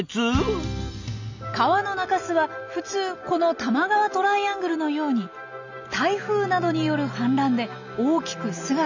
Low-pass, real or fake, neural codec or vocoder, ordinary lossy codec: 7.2 kHz; real; none; none